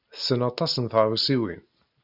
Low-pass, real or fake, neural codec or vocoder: 5.4 kHz; real; none